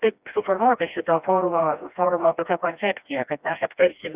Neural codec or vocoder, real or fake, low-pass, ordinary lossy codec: codec, 16 kHz, 1 kbps, FreqCodec, smaller model; fake; 3.6 kHz; Opus, 64 kbps